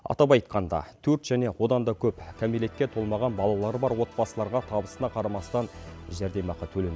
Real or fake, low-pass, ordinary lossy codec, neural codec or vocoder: real; none; none; none